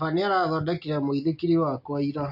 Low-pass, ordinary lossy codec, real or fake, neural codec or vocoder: 5.4 kHz; AAC, 48 kbps; real; none